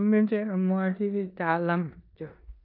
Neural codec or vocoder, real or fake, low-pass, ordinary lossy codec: codec, 16 kHz in and 24 kHz out, 0.9 kbps, LongCat-Audio-Codec, four codebook decoder; fake; 5.4 kHz; none